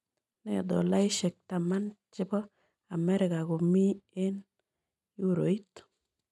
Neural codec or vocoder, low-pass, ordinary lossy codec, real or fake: none; none; none; real